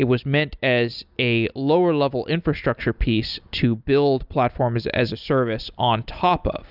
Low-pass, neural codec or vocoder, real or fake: 5.4 kHz; none; real